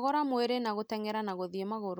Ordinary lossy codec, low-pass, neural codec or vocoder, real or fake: none; none; none; real